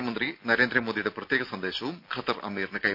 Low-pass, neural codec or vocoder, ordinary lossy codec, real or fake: 5.4 kHz; none; none; real